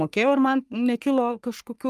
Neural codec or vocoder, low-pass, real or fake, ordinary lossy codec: codec, 44.1 kHz, 3.4 kbps, Pupu-Codec; 14.4 kHz; fake; Opus, 32 kbps